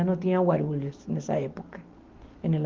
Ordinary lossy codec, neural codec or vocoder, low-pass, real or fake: Opus, 32 kbps; none; 7.2 kHz; real